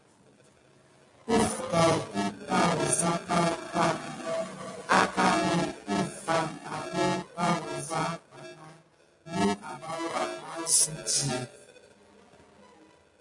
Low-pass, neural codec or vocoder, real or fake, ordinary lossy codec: 10.8 kHz; none; real; MP3, 48 kbps